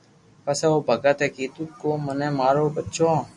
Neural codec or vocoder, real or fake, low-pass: none; real; 10.8 kHz